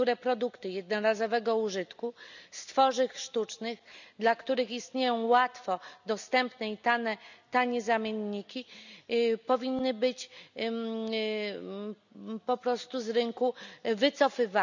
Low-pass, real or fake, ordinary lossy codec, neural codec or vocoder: 7.2 kHz; real; none; none